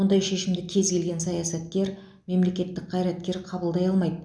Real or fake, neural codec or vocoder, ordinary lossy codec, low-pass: real; none; none; none